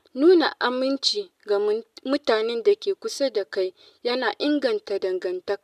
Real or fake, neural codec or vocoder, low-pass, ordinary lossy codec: real; none; 14.4 kHz; none